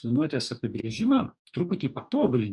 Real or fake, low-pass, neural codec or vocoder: fake; 10.8 kHz; codec, 44.1 kHz, 2.6 kbps, DAC